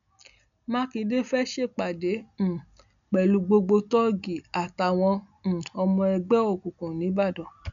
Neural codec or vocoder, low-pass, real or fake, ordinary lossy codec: none; 7.2 kHz; real; none